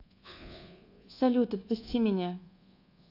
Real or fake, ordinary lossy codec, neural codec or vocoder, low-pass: fake; AAC, 32 kbps; codec, 24 kHz, 1.2 kbps, DualCodec; 5.4 kHz